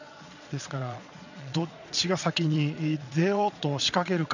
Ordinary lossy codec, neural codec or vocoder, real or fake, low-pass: none; vocoder, 22.05 kHz, 80 mel bands, Vocos; fake; 7.2 kHz